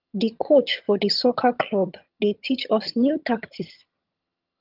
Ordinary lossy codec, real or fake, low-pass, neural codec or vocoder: Opus, 32 kbps; fake; 5.4 kHz; vocoder, 22.05 kHz, 80 mel bands, HiFi-GAN